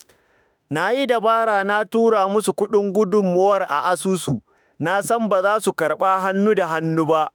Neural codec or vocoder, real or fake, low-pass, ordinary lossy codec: autoencoder, 48 kHz, 32 numbers a frame, DAC-VAE, trained on Japanese speech; fake; none; none